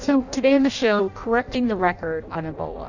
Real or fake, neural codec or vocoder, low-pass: fake; codec, 16 kHz in and 24 kHz out, 0.6 kbps, FireRedTTS-2 codec; 7.2 kHz